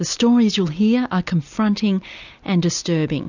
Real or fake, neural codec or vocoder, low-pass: real; none; 7.2 kHz